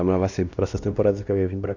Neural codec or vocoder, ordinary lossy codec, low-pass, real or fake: codec, 16 kHz, 1 kbps, X-Codec, WavLM features, trained on Multilingual LibriSpeech; none; 7.2 kHz; fake